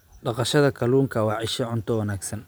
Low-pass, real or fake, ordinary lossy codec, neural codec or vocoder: none; fake; none; vocoder, 44.1 kHz, 128 mel bands every 512 samples, BigVGAN v2